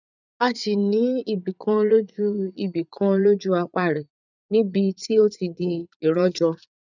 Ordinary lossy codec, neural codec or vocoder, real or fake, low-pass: none; vocoder, 44.1 kHz, 80 mel bands, Vocos; fake; 7.2 kHz